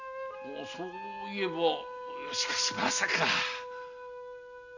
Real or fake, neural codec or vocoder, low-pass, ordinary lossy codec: real; none; 7.2 kHz; none